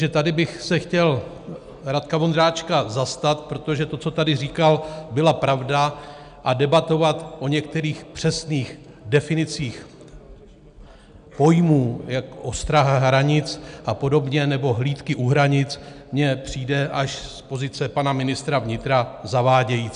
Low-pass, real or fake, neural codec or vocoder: 9.9 kHz; real; none